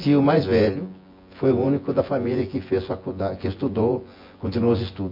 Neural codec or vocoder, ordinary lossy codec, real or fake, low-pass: vocoder, 24 kHz, 100 mel bands, Vocos; MP3, 32 kbps; fake; 5.4 kHz